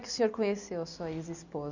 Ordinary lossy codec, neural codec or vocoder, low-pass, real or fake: none; none; 7.2 kHz; real